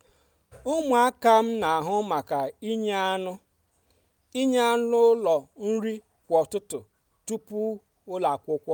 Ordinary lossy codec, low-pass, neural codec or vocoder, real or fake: none; none; none; real